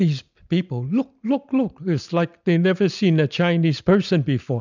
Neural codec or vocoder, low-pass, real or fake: none; 7.2 kHz; real